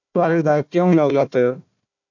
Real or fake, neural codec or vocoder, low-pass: fake; codec, 16 kHz, 1 kbps, FunCodec, trained on Chinese and English, 50 frames a second; 7.2 kHz